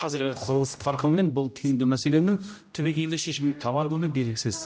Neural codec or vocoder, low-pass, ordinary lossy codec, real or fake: codec, 16 kHz, 0.5 kbps, X-Codec, HuBERT features, trained on general audio; none; none; fake